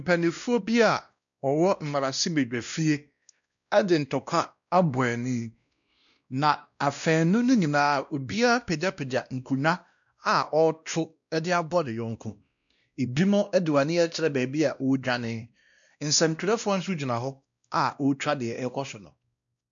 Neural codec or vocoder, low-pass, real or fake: codec, 16 kHz, 1 kbps, X-Codec, WavLM features, trained on Multilingual LibriSpeech; 7.2 kHz; fake